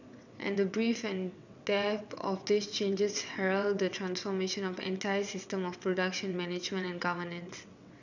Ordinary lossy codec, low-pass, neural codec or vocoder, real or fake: none; 7.2 kHz; vocoder, 22.05 kHz, 80 mel bands, WaveNeXt; fake